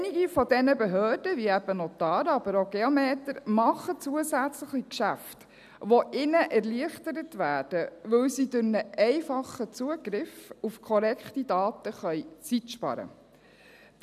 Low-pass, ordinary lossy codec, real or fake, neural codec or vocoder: 14.4 kHz; none; real; none